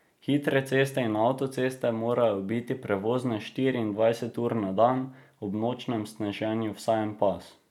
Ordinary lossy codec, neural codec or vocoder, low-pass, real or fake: none; none; 19.8 kHz; real